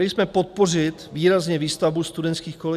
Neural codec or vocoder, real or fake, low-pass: none; real; 14.4 kHz